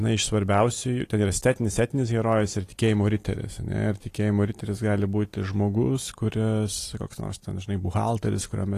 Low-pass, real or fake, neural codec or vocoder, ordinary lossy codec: 14.4 kHz; real; none; AAC, 48 kbps